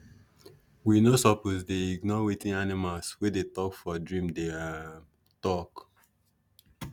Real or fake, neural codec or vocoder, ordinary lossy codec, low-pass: real; none; none; 19.8 kHz